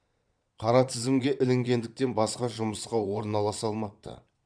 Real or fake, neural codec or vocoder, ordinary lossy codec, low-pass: fake; vocoder, 22.05 kHz, 80 mel bands, Vocos; none; 9.9 kHz